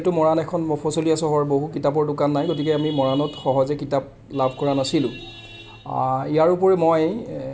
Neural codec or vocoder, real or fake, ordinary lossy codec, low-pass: none; real; none; none